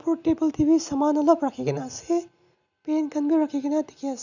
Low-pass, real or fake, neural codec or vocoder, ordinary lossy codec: 7.2 kHz; real; none; none